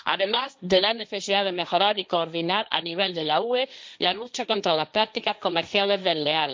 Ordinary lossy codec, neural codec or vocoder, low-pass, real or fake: none; codec, 16 kHz, 1.1 kbps, Voila-Tokenizer; none; fake